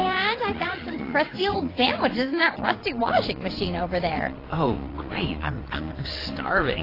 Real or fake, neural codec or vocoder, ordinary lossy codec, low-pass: fake; vocoder, 22.05 kHz, 80 mel bands, WaveNeXt; AAC, 24 kbps; 5.4 kHz